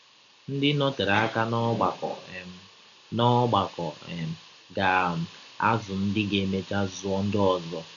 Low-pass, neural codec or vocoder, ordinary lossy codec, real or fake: 7.2 kHz; none; none; real